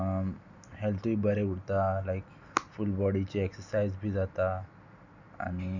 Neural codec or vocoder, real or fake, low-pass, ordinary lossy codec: none; real; 7.2 kHz; none